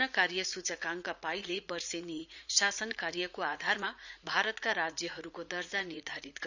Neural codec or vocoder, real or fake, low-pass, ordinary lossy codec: vocoder, 44.1 kHz, 80 mel bands, Vocos; fake; 7.2 kHz; none